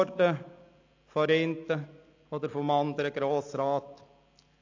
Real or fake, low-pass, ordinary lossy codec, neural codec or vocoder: real; 7.2 kHz; AAC, 48 kbps; none